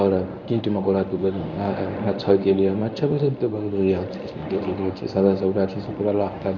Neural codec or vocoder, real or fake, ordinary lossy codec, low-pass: codec, 24 kHz, 0.9 kbps, WavTokenizer, medium speech release version 1; fake; none; 7.2 kHz